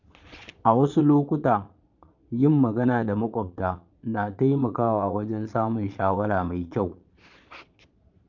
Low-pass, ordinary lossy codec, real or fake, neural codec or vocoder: 7.2 kHz; none; fake; vocoder, 22.05 kHz, 80 mel bands, Vocos